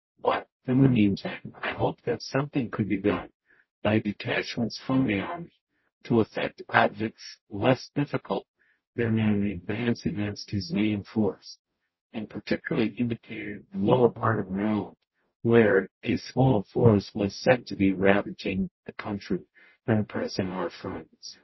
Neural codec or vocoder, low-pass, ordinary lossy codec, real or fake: codec, 44.1 kHz, 0.9 kbps, DAC; 7.2 kHz; MP3, 24 kbps; fake